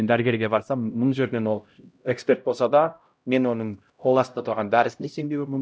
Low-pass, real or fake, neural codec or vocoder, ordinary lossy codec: none; fake; codec, 16 kHz, 0.5 kbps, X-Codec, HuBERT features, trained on LibriSpeech; none